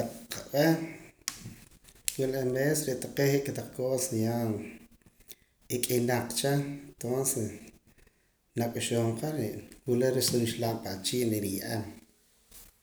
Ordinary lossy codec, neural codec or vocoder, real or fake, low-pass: none; none; real; none